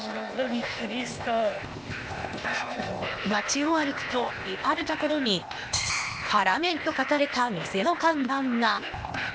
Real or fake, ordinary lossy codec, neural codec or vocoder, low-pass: fake; none; codec, 16 kHz, 0.8 kbps, ZipCodec; none